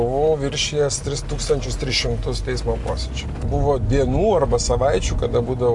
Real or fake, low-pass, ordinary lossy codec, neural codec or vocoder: real; 10.8 kHz; AAC, 64 kbps; none